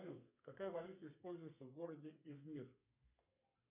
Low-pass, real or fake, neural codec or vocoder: 3.6 kHz; fake; codec, 32 kHz, 1.9 kbps, SNAC